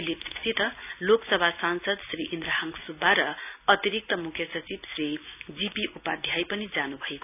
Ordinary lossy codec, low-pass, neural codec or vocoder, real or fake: none; 3.6 kHz; none; real